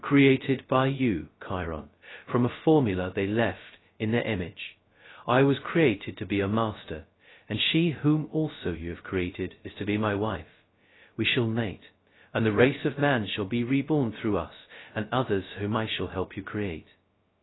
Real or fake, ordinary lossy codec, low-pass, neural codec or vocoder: fake; AAC, 16 kbps; 7.2 kHz; codec, 16 kHz, 0.2 kbps, FocalCodec